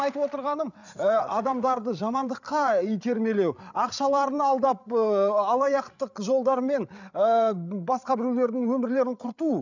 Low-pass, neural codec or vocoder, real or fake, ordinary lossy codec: 7.2 kHz; codec, 16 kHz, 8 kbps, FreqCodec, larger model; fake; none